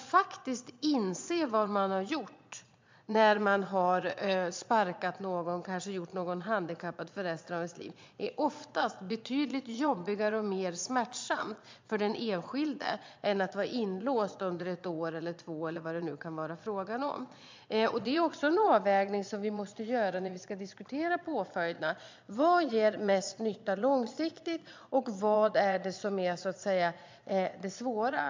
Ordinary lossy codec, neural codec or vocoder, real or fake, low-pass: MP3, 64 kbps; vocoder, 22.05 kHz, 80 mel bands, Vocos; fake; 7.2 kHz